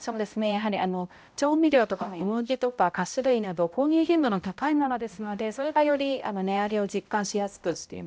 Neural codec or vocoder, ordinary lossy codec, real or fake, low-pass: codec, 16 kHz, 0.5 kbps, X-Codec, HuBERT features, trained on balanced general audio; none; fake; none